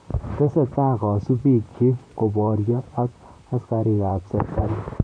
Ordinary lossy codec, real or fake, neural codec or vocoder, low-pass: none; fake; vocoder, 44.1 kHz, 128 mel bands, Pupu-Vocoder; 9.9 kHz